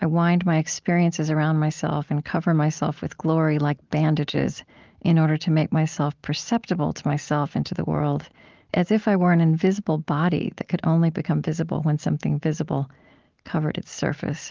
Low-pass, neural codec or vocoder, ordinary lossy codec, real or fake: 7.2 kHz; none; Opus, 32 kbps; real